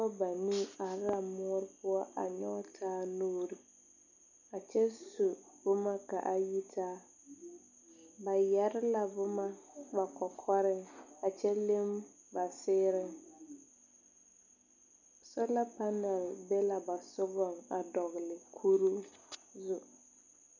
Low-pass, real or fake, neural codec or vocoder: 7.2 kHz; real; none